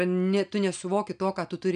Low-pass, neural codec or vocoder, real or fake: 9.9 kHz; none; real